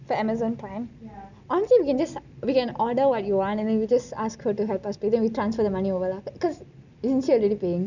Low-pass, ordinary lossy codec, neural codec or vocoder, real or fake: 7.2 kHz; none; none; real